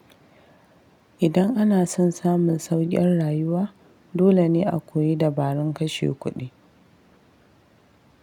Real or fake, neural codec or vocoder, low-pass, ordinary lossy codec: real; none; 19.8 kHz; none